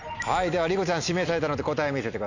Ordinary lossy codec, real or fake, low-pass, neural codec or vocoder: none; real; 7.2 kHz; none